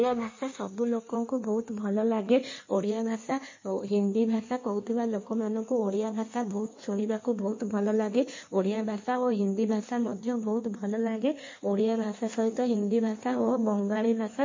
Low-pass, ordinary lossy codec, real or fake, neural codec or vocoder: 7.2 kHz; MP3, 32 kbps; fake; codec, 16 kHz in and 24 kHz out, 1.1 kbps, FireRedTTS-2 codec